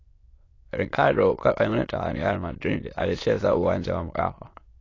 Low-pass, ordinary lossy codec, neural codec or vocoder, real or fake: 7.2 kHz; AAC, 32 kbps; autoencoder, 22.05 kHz, a latent of 192 numbers a frame, VITS, trained on many speakers; fake